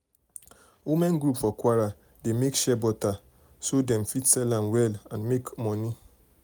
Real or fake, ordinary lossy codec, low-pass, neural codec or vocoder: real; none; none; none